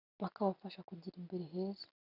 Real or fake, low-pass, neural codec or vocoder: real; 5.4 kHz; none